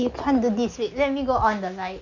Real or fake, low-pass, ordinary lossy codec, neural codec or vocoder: real; 7.2 kHz; none; none